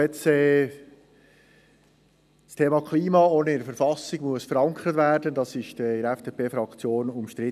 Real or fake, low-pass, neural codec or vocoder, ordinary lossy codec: real; 14.4 kHz; none; none